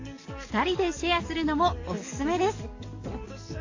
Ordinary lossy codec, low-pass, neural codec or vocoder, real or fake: AAC, 48 kbps; 7.2 kHz; codec, 44.1 kHz, 7.8 kbps, DAC; fake